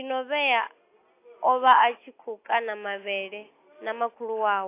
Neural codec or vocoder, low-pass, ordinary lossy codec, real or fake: none; 3.6 kHz; AAC, 24 kbps; real